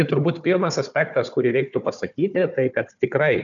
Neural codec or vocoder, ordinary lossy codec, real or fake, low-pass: codec, 16 kHz, 4 kbps, FunCodec, trained on Chinese and English, 50 frames a second; MP3, 96 kbps; fake; 7.2 kHz